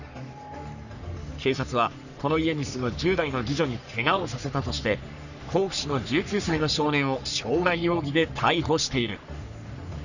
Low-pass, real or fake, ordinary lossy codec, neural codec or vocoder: 7.2 kHz; fake; none; codec, 44.1 kHz, 3.4 kbps, Pupu-Codec